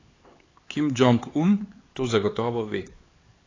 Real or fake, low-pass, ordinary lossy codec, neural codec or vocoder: fake; 7.2 kHz; AAC, 32 kbps; codec, 16 kHz, 4 kbps, X-Codec, HuBERT features, trained on LibriSpeech